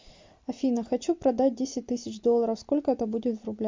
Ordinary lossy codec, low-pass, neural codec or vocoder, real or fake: MP3, 48 kbps; 7.2 kHz; none; real